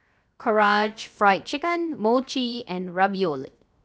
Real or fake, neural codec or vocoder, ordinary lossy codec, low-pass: fake; codec, 16 kHz, 0.7 kbps, FocalCodec; none; none